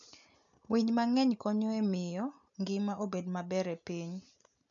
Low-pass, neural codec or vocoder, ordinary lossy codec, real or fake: 7.2 kHz; none; none; real